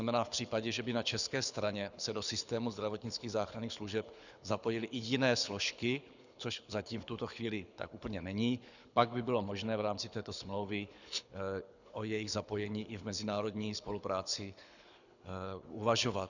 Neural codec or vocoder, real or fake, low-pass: codec, 24 kHz, 6 kbps, HILCodec; fake; 7.2 kHz